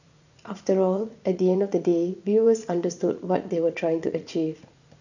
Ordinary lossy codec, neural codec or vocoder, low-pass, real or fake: none; vocoder, 44.1 kHz, 80 mel bands, Vocos; 7.2 kHz; fake